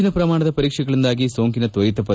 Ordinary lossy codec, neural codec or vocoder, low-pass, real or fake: none; none; none; real